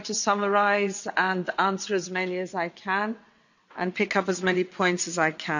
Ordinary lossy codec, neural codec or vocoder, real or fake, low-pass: none; vocoder, 22.05 kHz, 80 mel bands, WaveNeXt; fake; 7.2 kHz